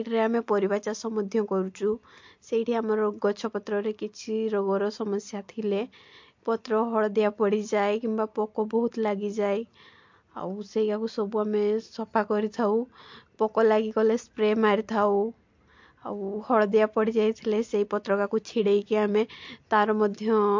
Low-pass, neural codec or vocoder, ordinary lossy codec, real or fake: 7.2 kHz; none; MP3, 48 kbps; real